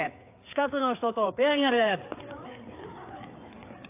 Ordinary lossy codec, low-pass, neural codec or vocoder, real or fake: AAC, 24 kbps; 3.6 kHz; codec, 16 kHz, 4 kbps, FreqCodec, larger model; fake